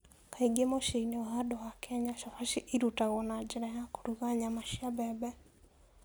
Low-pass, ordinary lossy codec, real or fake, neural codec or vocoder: none; none; real; none